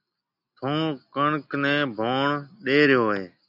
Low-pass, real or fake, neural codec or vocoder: 5.4 kHz; real; none